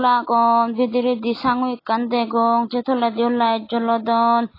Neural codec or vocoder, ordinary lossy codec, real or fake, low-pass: none; AAC, 24 kbps; real; 5.4 kHz